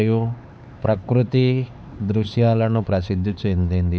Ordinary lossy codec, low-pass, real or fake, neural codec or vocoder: none; none; fake; codec, 16 kHz, 4 kbps, X-Codec, HuBERT features, trained on LibriSpeech